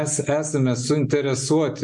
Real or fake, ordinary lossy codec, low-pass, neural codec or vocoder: real; MP3, 64 kbps; 10.8 kHz; none